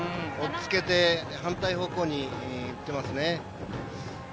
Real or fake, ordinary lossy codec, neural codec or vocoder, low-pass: real; none; none; none